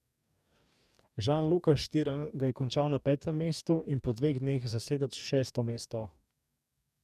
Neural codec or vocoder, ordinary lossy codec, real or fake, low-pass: codec, 44.1 kHz, 2.6 kbps, DAC; none; fake; 14.4 kHz